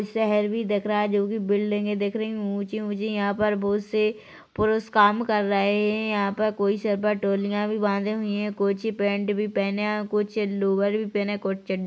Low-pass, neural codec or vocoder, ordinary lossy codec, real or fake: none; none; none; real